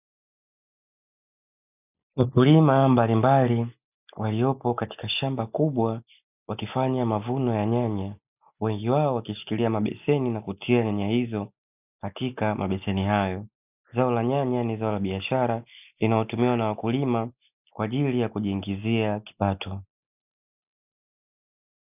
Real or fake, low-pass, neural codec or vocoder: real; 3.6 kHz; none